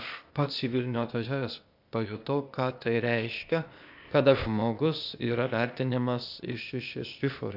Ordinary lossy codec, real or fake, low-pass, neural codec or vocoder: AAC, 48 kbps; fake; 5.4 kHz; codec, 16 kHz, 0.8 kbps, ZipCodec